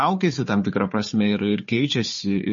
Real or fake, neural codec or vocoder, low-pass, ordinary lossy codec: fake; codec, 16 kHz, 4 kbps, FunCodec, trained on Chinese and English, 50 frames a second; 7.2 kHz; MP3, 32 kbps